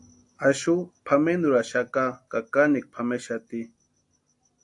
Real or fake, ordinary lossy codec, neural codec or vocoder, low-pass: real; AAC, 64 kbps; none; 10.8 kHz